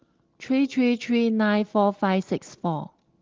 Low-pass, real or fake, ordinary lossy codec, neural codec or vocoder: 7.2 kHz; real; Opus, 16 kbps; none